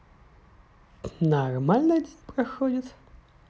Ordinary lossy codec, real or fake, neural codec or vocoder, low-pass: none; real; none; none